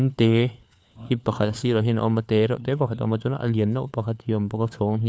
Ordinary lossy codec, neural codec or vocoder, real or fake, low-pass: none; codec, 16 kHz, 4 kbps, FunCodec, trained on LibriTTS, 50 frames a second; fake; none